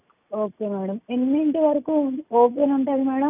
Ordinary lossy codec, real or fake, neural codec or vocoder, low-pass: none; real; none; 3.6 kHz